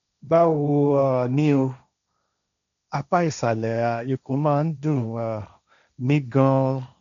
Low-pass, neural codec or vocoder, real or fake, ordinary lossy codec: 7.2 kHz; codec, 16 kHz, 1.1 kbps, Voila-Tokenizer; fake; none